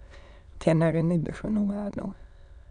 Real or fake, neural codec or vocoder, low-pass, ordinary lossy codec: fake; autoencoder, 22.05 kHz, a latent of 192 numbers a frame, VITS, trained on many speakers; 9.9 kHz; none